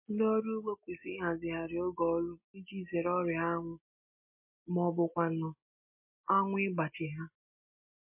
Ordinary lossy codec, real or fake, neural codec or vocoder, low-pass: MP3, 24 kbps; real; none; 3.6 kHz